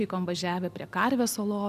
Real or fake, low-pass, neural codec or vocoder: real; 14.4 kHz; none